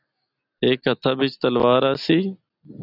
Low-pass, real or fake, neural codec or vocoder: 5.4 kHz; real; none